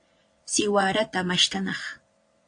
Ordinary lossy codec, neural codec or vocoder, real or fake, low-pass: AAC, 48 kbps; vocoder, 22.05 kHz, 80 mel bands, Vocos; fake; 9.9 kHz